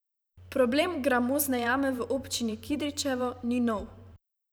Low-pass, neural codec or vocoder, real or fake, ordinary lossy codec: none; vocoder, 44.1 kHz, 128 mel bands every 512 samples, BigVGAN v2; fake; none